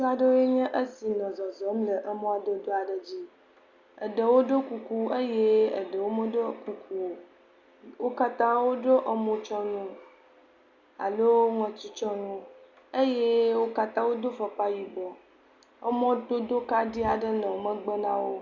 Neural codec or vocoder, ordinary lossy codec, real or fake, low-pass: none; Opus, 64 kbps; real; 7.2 kHz